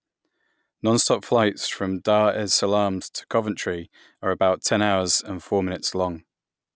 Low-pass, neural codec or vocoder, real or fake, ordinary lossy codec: none; none; real; none